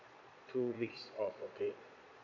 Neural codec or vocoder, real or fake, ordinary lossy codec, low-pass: vocoder, 22.05 kHz, 80 mel bands, Vocos; fake; none; 7.2 kHz